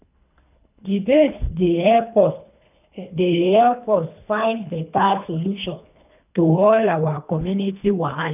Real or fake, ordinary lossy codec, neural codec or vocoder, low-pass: fake; none; codec, 24 kHz, 3 kbps, HILCodec; 3.6 kHz